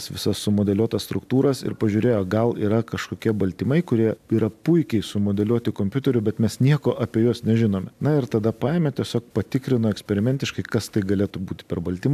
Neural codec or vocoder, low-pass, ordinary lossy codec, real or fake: none; 14.4 kHz; MP3, 96 kbps; real